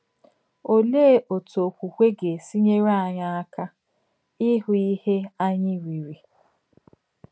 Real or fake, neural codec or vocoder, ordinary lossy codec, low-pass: real; none; none; none